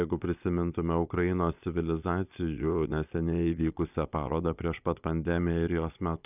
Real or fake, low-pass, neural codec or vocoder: fake; 3.6 kHz; vocoder, 22.05 kHz, 80 mel bands, Vocos